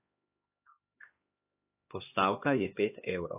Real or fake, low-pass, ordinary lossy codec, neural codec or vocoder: fake; 3.6 kHz; AAC, 24 kbps; codec, 16 kHz, 2 kbps, X-Codec, HuBERT features, trained on LibriSpeech